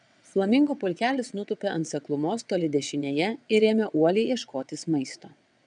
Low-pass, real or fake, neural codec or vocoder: 9.9 kHz; fake; vocoder, 22.05 kHz, 80 mel bands, WaveNeXt